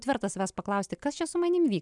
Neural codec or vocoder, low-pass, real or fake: none; 10.8 kHz; real